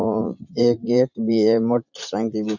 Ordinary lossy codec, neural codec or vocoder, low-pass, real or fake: none; vocoder, 44.1 kHz, 80 mel bands, Vocos; 7.2 kHz; fake